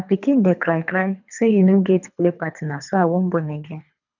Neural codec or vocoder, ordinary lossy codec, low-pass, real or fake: codec, 24 kHz, 3 kbps, HILCodec; none; 7.2 kHz; fake